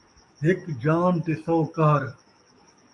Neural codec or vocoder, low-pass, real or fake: codec, 44.1 kHz, 7.8 kbps, DAC; 10.8 kHz; fake